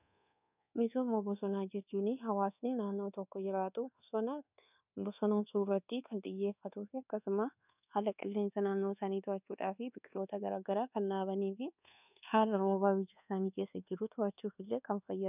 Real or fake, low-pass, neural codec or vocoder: fake; 3.6 kHz; codec, 24 kHz, 1.2 kbps, DualCodec